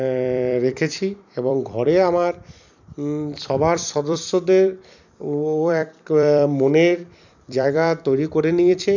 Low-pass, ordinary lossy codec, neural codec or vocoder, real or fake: 7.2 kHz; none; vocoder, 44.1 kHz, 128 mel bands every 256 samples, BigVGAN v2; fake